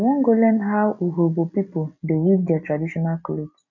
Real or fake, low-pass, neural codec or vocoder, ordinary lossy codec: real; 7.2 kHz; none; none